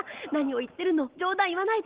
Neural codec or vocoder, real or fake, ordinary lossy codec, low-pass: none; real; Opus, 16 kbps; 3.6 kHz